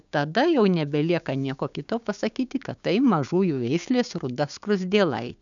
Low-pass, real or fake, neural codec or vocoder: 7.2 kHz; fake; codec, 16 kHz, 6 kbps, DAC